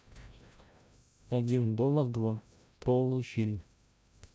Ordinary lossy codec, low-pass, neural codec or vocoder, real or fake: none; none; codec, 16 kHz, 0.5 kbps, FreqCodec, larger model; fake